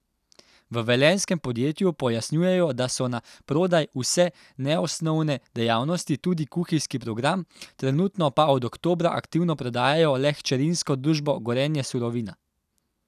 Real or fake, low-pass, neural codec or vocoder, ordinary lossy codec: real; 14.4 kHz; none; none